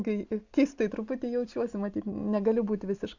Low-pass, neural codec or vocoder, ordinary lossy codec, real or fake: 7.2 kHz; none; Opus, 64 kbps; real